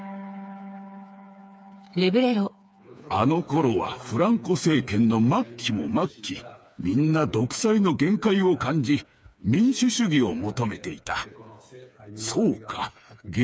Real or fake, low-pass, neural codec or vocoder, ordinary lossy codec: fake; none; codec, 16 kHz, 4 kbps, FreqCodec, smaller model; none